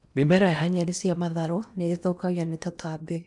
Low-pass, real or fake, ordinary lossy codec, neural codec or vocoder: 10.8 kHz; fake; none; codec, 16 kHz in and 24 kHz out, 0.8 kbps, FocalCodec, streaming, 65536 codes